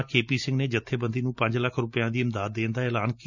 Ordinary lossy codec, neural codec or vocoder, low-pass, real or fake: none; none; 7.2 kHz; real